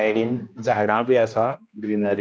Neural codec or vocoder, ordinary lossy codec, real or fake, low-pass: codec, 16 kHz, 1 kbps, X-Codec, HuBERT features, trained on general audio; none; fake; none